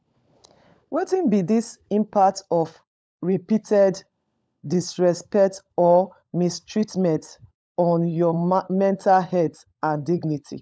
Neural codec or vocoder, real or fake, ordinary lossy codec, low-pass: codec, 16 kHz, 16 kbps, FunCodec, trained on LibriTTS, 50 frames a second; fake; none; none